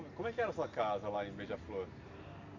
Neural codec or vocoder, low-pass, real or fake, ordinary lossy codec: none; 7.2 kHz; real; MP3, 64 kbps